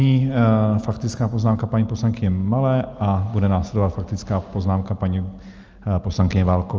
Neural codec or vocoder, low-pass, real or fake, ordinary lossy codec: none; 7.2 kHz; real; Opus, 24 kbps